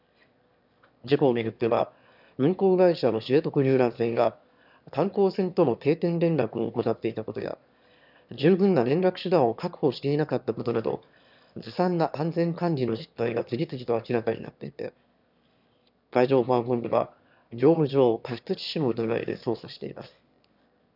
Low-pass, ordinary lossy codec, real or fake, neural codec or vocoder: 5.4 kHz; none; fake; autoencoder, 22.05 kHz, a latent of 192 numbers a frame, VITS, trained on one speaker